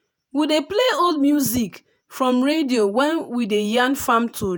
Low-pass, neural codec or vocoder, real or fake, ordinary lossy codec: none; vocoder, 48 kHz, 128 mel bands, Vocos; fake; none